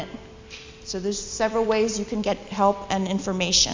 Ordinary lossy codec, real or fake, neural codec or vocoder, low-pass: MP3, 64 kbps; real; none; 7.2 kHz